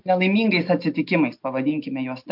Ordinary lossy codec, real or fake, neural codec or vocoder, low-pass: MP3, 48 kbps; real; none; 5.4 kHz